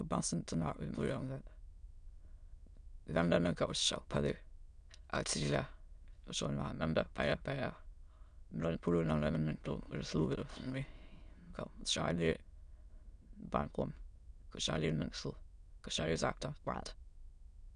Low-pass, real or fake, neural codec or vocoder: 9.9 kHz; fake; autoencoder, 22.05 kHz, a latent of 192 numbers a frame, VITS, trained on many speakers